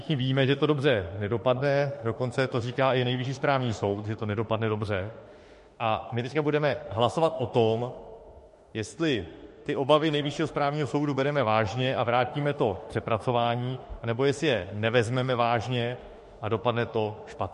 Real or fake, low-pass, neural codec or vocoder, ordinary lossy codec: fake; 14.4 kHz; autoencoder, 48 kHz, 32 numbers a frame, DAC-VAE, trained on Japanese speech; MP3, 48 kbps